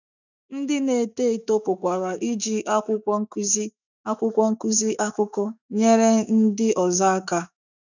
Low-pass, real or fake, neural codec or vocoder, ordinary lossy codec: 7.2 kHz; fake; autoencoder, 48 kHz, 128 numbers a frame, DAC-VAE, trained on Japanese speech; none